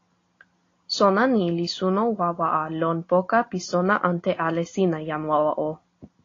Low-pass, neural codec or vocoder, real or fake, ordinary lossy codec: 7.2 kHz; none; real; AAC, 32 kbps